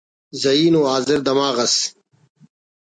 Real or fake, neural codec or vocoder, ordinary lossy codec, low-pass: real; none; MP3, 64 kbps; 9.9 kHz